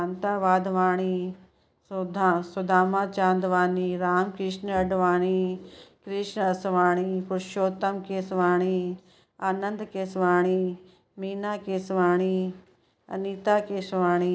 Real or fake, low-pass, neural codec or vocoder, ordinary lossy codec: real; none; none; none